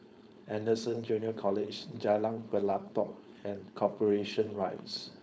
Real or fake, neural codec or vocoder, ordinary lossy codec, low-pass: fake; codec, 16 kHz, 4.8 kbps, FACodec; none; none